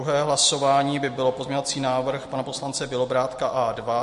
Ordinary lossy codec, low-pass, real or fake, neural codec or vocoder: MP3, 48 kbps; 14.4 kHz; real; none